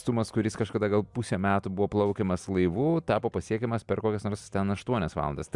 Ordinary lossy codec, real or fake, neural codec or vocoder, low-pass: MP3, 96 kbps; real; none; 10.8 kHz